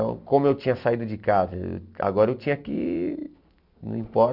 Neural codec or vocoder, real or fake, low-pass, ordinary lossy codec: none; real; 5.4 kHz; none